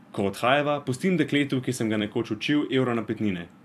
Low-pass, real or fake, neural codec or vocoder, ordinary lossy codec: 14.4 kHz; fake; vocoder, 44.1 kHz, 128 mel bands every 256 samples, BigVGAN v2; AAC, 96 kbps